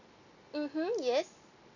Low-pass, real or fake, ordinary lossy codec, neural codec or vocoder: 7.2 kHz; real; none; none